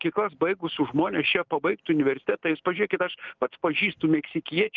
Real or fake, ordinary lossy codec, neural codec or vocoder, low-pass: real; Opus, 24 kbps; none; 7.2 kHz